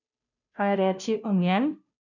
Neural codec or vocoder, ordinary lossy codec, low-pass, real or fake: codec, 16 kHz, 0.5 kbps, FunCodec, trained on Chinese and English, 25 frames a second; none; 7.2 kHz; fake